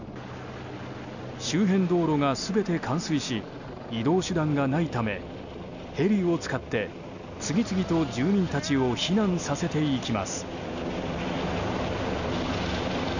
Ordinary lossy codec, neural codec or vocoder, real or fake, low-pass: none; none; real; 7.2 kHz